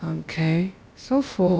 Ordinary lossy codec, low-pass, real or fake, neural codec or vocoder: none; none; fake; codec, 16 kHz, about 1 kbps, DyCAST, with the encoder's durations